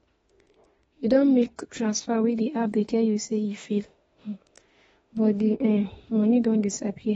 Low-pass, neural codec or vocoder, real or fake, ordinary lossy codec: 19.8 kHz; autoencoder, 48 kHz, 32 numbers a frame, DAC-VAE, trained on Japanese speech; fake; AAC, 24 kbps